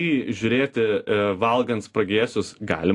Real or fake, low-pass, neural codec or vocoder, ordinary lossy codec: real; 10.8 kHz; none; AAC, 48 kbps